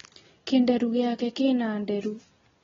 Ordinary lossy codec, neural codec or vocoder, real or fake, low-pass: AAC, 24 kbps; none; real; 7.2 kHz